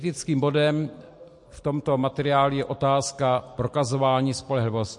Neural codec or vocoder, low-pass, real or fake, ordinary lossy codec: none; 10.8 kHz; real; MP3, 48 kbps